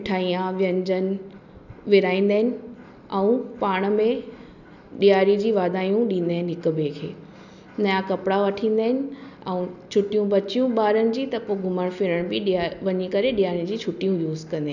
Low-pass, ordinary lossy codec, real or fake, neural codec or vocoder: 7.2 kHz; none; real; none